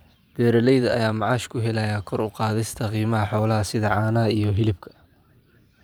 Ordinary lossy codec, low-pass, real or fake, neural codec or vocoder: none; none; fake; vocoder, 44.1 kHz, 128 mel bands every 512 samples, BigVGAN v2